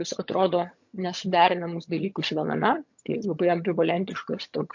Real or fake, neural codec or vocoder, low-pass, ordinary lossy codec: fake; vocoder, 22.05 kHz, 80 mel bands, HiFi-GAN; 7.2 kHz; MP3, 48 kbps